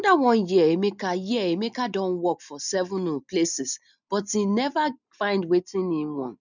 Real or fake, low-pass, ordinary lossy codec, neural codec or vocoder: real; 7.2 kHz; none; none